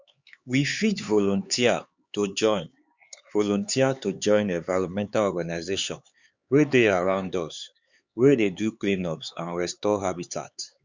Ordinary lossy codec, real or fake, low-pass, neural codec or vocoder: Opus, 64 kbps; fake; 7.2 kHz; codec, 16 kHz, 4 kbps, X-Codec, HuBERT features, trained on LibriSpeech